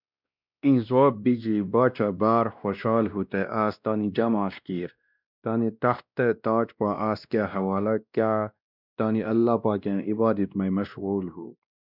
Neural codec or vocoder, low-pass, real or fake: codec, 16 kHz, 1 kbps, X-Codec, WavLM features, trained on Multilingual LibriSpeech; 5.4 kHz; fake